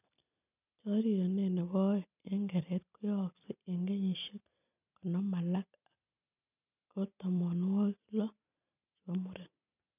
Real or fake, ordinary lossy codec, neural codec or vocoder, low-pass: real; none; none; 3.6 kHz